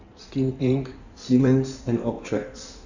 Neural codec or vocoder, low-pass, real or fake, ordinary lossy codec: codec, 16 kHz in and 24 kHz out, 1.1 kbps, FireRedTTS-2 codec; 7.2 kHz; fake; none